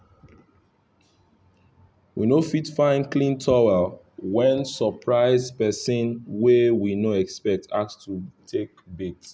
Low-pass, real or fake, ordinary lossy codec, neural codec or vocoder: none; real; none; none